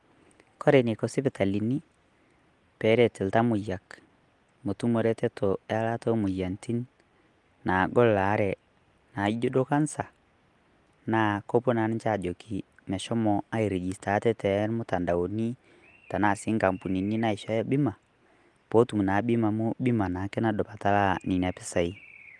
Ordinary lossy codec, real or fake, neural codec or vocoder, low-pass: Opus, 24 kbps; real; none; 10.8 kHz